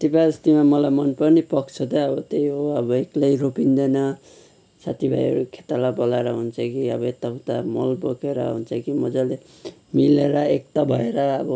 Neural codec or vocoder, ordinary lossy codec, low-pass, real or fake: none; none; none; real